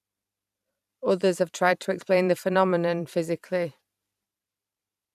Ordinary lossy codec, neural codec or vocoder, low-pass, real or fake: none; vocoder, 44.1 kHz, 128 mel bands every 512 samples, BigVGAN v2; 14.4 kHz; fake